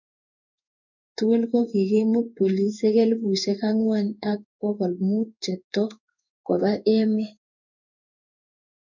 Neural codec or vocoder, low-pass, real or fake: codec, 16 kHz in and 24 kHz out, 1 kbps, XY-Tokenizer; 7.2 kHz; fake